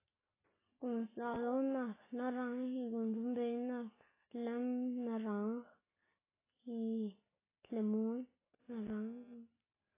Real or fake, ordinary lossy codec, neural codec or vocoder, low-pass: real; AAC, 16 kbps; none; 3.6 kHz